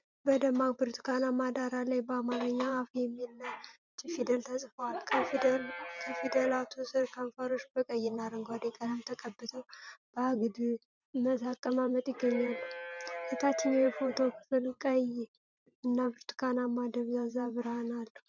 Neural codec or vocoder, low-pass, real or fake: vocoder, 44.1 kHz, 128 mel bands every 512 samples, BigVGAN v2; 7.2 kHz; fake